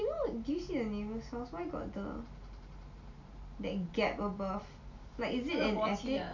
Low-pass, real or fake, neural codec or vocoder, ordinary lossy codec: 7.2 kHz; real; none; MP3, 64 kbps